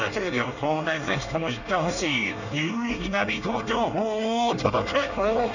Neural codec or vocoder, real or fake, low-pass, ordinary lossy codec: codec, 24 kHz, 1 kbps, SNAC; fake; 7.2 kHz; none